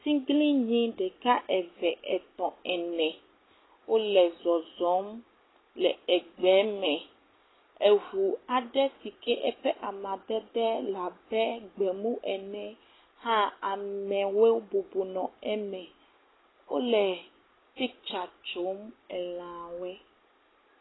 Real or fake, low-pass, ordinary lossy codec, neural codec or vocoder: real; 7.2 kHz; AAC, 16 kbps; none